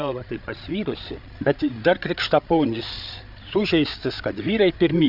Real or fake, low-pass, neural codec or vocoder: fake; 5.4 kHz; codec, 16 kHz, 8 kbps, FreqCodec, larger model